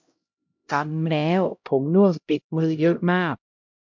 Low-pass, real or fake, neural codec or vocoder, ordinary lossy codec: 7.2 kHz; fake; codec, 16 kHz, 0.5 kbps, X-Codec, HuBERT features, trained on LibriSpeech; MP3, 48 kbps